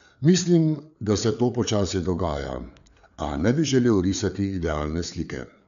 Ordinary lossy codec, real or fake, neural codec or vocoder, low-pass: none; fake; codec, 16 kHz, 4 kbps, FreqCodec, larger model; 7.2 kHz